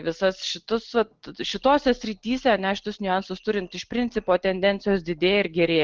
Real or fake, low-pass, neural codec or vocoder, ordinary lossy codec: real; 7.2 kHz; none; Opus, 16 kbps